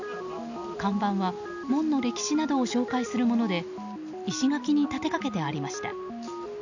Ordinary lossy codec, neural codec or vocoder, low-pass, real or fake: none; none; 7.2 kHz; real